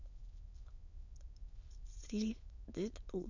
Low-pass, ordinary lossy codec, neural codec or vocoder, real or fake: 7.2 kHz; none; autoencoder, 22.05 kHz, a latent of 192 numbers a frame, VITS, trained on many speakers; fake